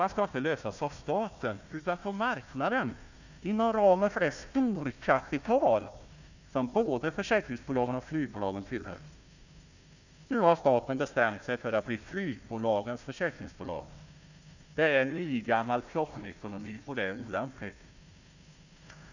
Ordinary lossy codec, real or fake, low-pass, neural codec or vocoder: none; fake; 7.2 kHz; codec, 16 kHz, 1 kbps, FunCodec, trained on Chinese and English, 50 frames a second